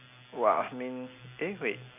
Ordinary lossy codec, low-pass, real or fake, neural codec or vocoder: none; 3.6 kHz; real; none